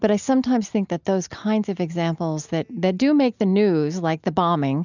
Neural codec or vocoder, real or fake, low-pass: none; real; 7.2 kHz